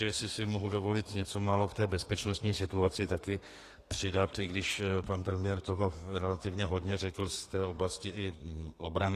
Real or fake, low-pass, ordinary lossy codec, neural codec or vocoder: fake; 14.4 kHz; AAC, 48 kbps; codec, 32 kHz, 1.9 kbps, SNAC